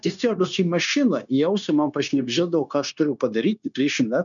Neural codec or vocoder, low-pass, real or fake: codec, 16 kHz, 0.9 kbps, LongCat-Audio-Codec; 7.2 kHz; fake